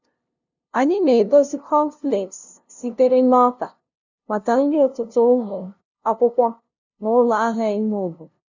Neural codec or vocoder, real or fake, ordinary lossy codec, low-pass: codec, 16 kHz, 0.5 kbps, FunCodec, trained on LibriTTS, 25 frames a second; fake; none; 7.2 kHz